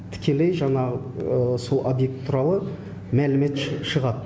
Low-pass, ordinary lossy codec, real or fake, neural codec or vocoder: none; none; real; none